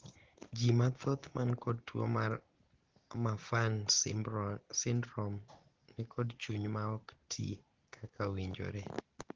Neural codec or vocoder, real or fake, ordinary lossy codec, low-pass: none; real; Opus, 16 kbps; 7.2 kHz